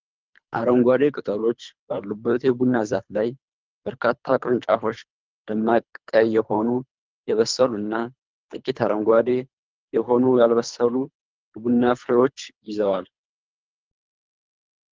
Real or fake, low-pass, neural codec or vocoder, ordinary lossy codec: fake; 7.2 kHz; codec, 24 kHz, 3 kbps, HILCodec; Opus, 32 kbps